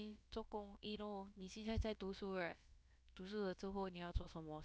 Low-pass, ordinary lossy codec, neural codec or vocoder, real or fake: none; none; codec, 16 kHz, about 1 kbps, DyCAST, with the encoder's durations; fake